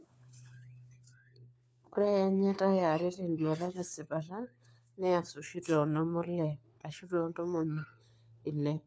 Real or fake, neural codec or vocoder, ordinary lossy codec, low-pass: fake; codec, 16 kHz, 4 kbps, FunCodec, trained on LibriTTS, 50 frames a second; none; none